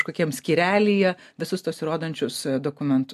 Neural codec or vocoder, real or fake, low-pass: none; real; 14.4 kHz